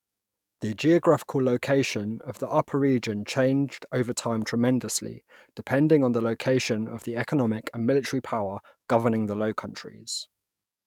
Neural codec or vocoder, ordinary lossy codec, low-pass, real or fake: codec, 44.1 kHz, 7.8 kbps, DAC; none; 19.8 kHz; fake